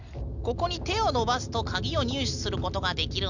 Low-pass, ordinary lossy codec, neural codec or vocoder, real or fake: 7.2 kHz; none; none; real